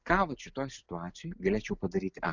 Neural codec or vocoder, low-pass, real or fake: none; 7.2 kHz; real